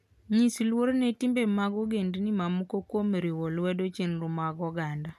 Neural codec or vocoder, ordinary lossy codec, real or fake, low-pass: none; none; real; 14.4 kHz